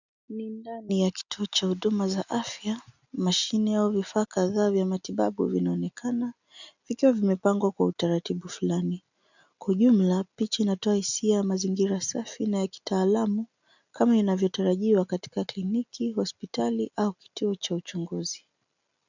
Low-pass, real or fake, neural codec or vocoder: 7.2 kHz; real; none